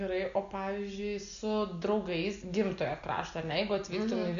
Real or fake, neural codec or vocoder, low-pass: real; none; 7.2 kHz